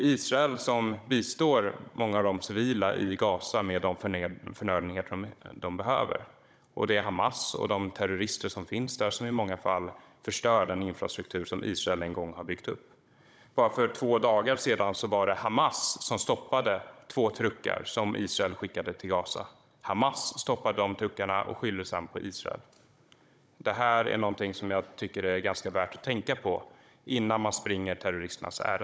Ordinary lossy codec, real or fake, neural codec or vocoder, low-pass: none; fake; codec, 16 kHz, 16 kbps, FunCodec, trained on Chinese and English, 50 frames a second; none